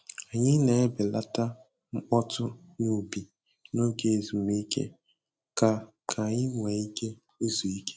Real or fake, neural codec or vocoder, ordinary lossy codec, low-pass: real; none; none; none